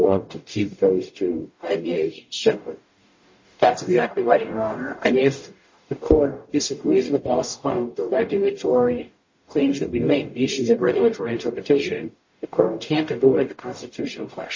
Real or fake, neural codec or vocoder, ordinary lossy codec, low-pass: fake; codec, 44.1 kHz, 0.9 kbps, DAC; MP3, 32 kbps; 7.2 kHz